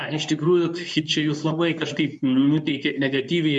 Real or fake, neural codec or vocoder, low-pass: fake; codec, 24 kHz, 0.9 kbps, WavTokenizer, medium speech release version 2; 10.8 kHz